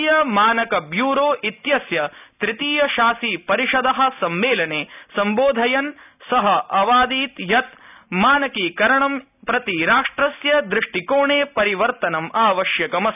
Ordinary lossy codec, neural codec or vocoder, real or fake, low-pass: none; none; real; 3.6 kHz